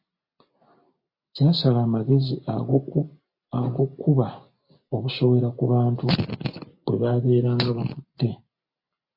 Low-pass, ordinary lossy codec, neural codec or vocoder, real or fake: 5.4 kHz; MP3, 32 kbps; none; real